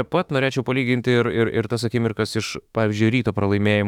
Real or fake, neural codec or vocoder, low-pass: fake; autoencoder, 48 kHz, 32 numbers a frame, DAC-VAE, trained on Japanese speech; 19.8 kHz